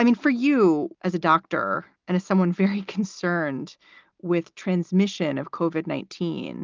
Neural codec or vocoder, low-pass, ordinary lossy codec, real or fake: none; 7.2 kHz; Opus, 32 kbps; real